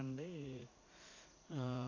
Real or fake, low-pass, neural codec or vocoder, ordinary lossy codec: fake; 7.2 kHz; vocoder, 44.1 kHz, 80 mel bands, Vocos; MP3, 64 kbps